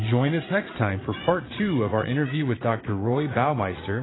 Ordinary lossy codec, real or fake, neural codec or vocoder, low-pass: AAC, 16 kbps; fake; vocoder, 44.1 kHz, 80 mel bands, Vocos; 7.2 kHz